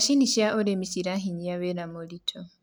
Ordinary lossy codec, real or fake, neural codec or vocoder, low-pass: none; real; none; none